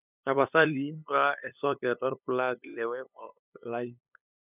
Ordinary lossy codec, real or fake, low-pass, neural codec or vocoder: none; fake; 3.6 kHz; codec, 16 kHz, 8 kbps, FunCodec, trained on LibriTTS, 25 frames a second